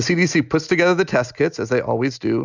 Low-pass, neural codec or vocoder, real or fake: 7.2 kHz; none; real